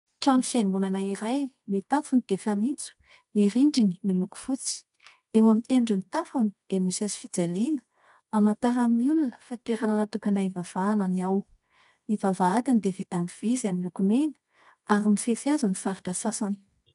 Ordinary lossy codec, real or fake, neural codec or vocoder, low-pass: AAC, 96 kbps; fake; codec, 24 kHz, 0.9 kbps, WavTokenizer, medium music audio release; 10.8 kHz